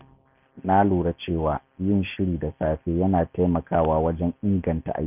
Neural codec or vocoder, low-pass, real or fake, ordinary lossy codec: none; 3.6 kHz; real; none